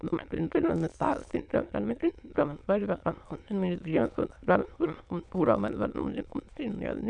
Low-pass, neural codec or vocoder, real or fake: 9.9 kHz; autoencoder, 22.05 kHz, a latent of 192 numbers a frame, VITS, trained on many speakers; fake